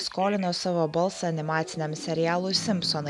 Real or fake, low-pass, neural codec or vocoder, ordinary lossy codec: real; 10.8 kHz; none; MP3, 96 kbps